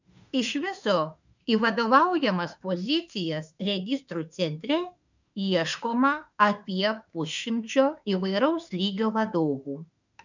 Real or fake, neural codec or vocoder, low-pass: fake; autoencoder, 48 kHz, 32 numbers a frame, DAC-VAE, trained on Japanese speech; 7.2 kHz